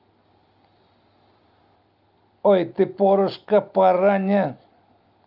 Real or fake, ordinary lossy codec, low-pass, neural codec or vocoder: real; Opus, 24 kbps; 5.4 kHz; none